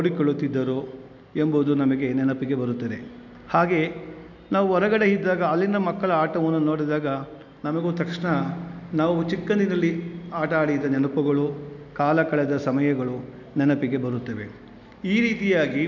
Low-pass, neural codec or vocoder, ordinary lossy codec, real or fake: 7.2 kHz; none; none; real